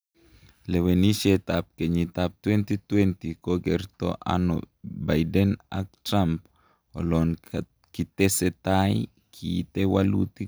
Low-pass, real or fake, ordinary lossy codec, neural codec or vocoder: none; real; none; none